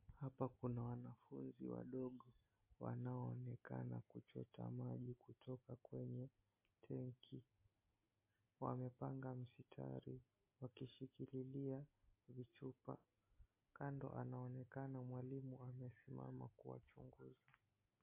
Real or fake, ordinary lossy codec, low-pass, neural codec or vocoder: real; MP3, 24 kbps; 3.6 kHz; none